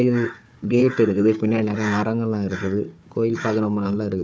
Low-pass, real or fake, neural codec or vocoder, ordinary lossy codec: none; fake; codec, 16 kHz, 4 kbps, FunCodec, trained on Chinese and English, 50 frames a second; none